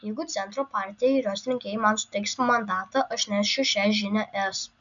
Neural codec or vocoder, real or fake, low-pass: none; real; 7.2 kHz